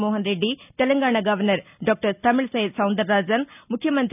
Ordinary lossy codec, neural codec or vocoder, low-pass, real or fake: none; none; 3.6 kHz; real